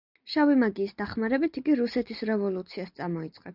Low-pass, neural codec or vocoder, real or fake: 5.4 kHz; none; real